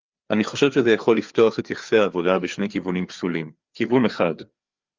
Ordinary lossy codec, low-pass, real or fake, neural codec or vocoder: Opus, 32 kbps; 7.2 kHz; fake; codec, 16 kHz, 4 kbps, FreqCodec, larger model